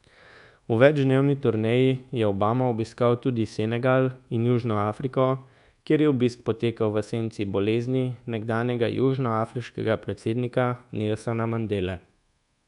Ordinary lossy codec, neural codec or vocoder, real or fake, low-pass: none; codec, 24 kHz, 1.2 kbps, DualCodec; fake; 10.8 kHz